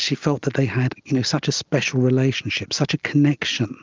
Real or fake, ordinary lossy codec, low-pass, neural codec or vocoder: real; Opus, 32 kbps; 7.2 kHz; none